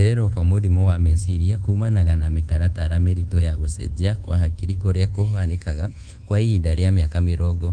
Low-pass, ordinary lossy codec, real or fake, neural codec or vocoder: 9.9 kHz; Opus, 24 kbps; fake; codec, 24 kHz, 1.2 kbps, DualCodec